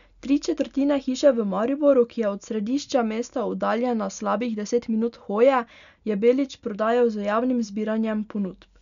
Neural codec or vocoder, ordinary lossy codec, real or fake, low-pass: none; none; real; 7.2 kHz